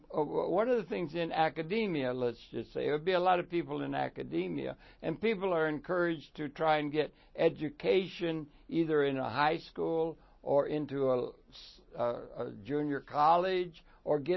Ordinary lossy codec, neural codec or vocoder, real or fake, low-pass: MP3, 24 kbps; none; real; 7.2 kHz